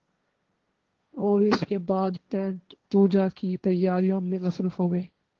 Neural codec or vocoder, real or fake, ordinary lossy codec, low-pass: codec, 16 kHz, 1.1 kbps, Voila-Tokenizer; fake; Opus, 16 kbps; 7.2 kHz